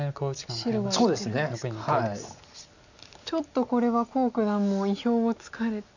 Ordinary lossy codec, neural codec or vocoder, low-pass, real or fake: none; none; 7.2 kHz; real